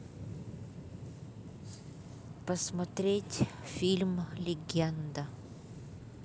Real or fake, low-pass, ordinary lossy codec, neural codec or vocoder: real; none; none; none